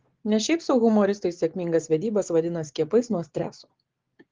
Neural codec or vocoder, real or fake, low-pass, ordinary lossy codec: none; real; 7.2 kHz; Opus, 16 kbps